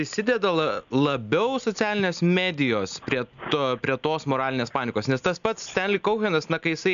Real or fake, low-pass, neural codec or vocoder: real; 7.2 kHz; none